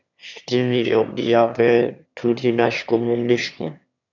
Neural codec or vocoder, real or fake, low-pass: autoencoder, 22.05 kHz, a latent of 192 numbers a frame, VITS, trained on one speaker; fake; 7.2 kHz